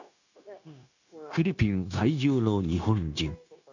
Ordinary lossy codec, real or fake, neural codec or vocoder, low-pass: none; fake; codec, 16 kHz, 0.9 kbps, LongCat-Audio-Codec; 7.2 kHz